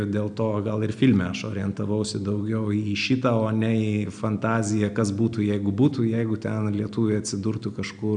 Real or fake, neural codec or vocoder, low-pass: real; none; 9.9 kHz